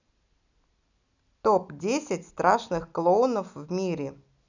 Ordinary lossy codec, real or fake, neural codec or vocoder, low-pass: none; real; none; 7.2 kHz